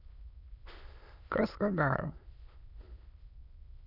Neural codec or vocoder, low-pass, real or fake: autoencoder, 22.05 kHz, a latent of 192 numbers a frame, VITS, trained on many speakers; 5.4 kHz; fake